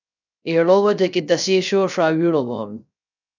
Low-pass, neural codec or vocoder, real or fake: 7.2 kHz; codec, 16 kHz, 0.3 kbps, FocalCodec; fake